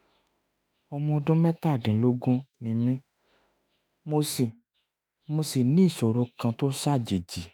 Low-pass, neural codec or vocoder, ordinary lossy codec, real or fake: none; autoencoder, 48 kHz, 32 numbers a frame, DAC-VAE, trained on Japanese speech; none; fake